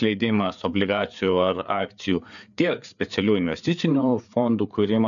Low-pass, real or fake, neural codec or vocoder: 7.2 kHz; fake; codec, 16 kHz, 4 kbps, FreqCodec, larger model